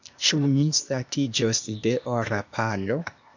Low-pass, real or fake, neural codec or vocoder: 7.2 kHz; fake; codec, 16 kHz, 0.8 kbps, ZipCodec